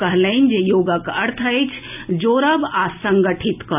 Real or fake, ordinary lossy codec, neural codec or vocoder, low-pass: real; none; none; 3.6 kHz